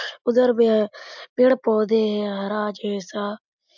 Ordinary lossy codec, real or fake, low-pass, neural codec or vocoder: MP3, 64 kbps; real; 7.2 kHz; none